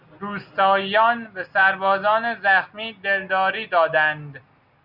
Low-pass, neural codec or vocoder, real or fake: 5.4 kHz; none; real